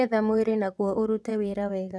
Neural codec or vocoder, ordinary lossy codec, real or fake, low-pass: vocoder, 22.05 kHz, 80 mel bands, WaveNeXt; none; fake; none